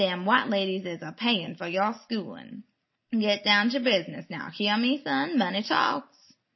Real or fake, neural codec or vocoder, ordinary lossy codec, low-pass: real; none; MP3, 24 kbps; 7.2 kHz